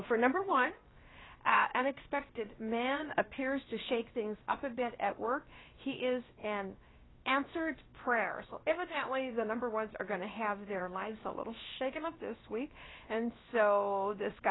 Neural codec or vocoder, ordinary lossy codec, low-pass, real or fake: codec, 16 kHz, about 1 kbps, DyCAST, with the encoder's durations; AAC, 16 kbps; 7.2 kHz; fake